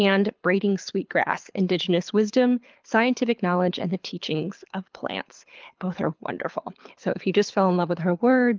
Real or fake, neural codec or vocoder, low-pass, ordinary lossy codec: fake; codec, 24 kHz, 6 kbps, HILCodec; 7.2 kHz; Opus, 24 kbps